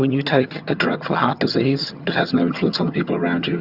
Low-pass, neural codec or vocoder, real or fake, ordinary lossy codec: 5.4 kHz; vocoder, 22.05 kHz, 80 mel bands, HiFi-GAN; fake; Opus, 64 kbps